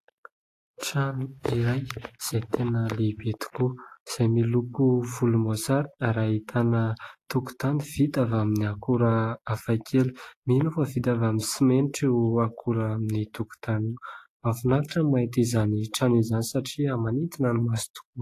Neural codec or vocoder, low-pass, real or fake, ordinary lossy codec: none; 14.4 kHz; real; AAC, 48 kbps